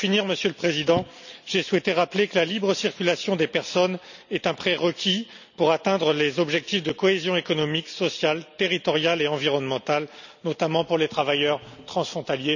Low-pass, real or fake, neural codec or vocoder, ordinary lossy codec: 7.2 kHz; real; none; none